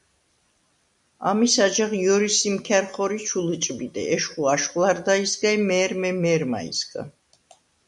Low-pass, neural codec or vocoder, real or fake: 10.8 kHz; none; real